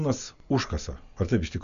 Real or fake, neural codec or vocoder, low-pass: real; none; 7.2 kHz